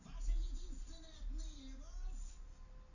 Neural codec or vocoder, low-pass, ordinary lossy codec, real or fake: none; 7.2 kHz; none; real